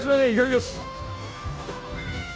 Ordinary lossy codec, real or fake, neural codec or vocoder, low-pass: none; fake; codec, 16 kHz, 0.5 kbps, FunCodec, trained on Chinese and English, 25 frames a second; none